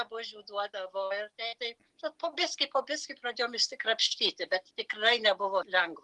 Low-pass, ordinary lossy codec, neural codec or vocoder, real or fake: 10.8 kHz; Opus, 24 kbps; none; real